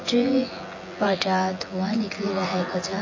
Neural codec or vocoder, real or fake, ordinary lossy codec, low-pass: vocoder, 24 kHz, 100 mel bands, Vocos; fake; MP3, 32 kbps; 7.2 kHz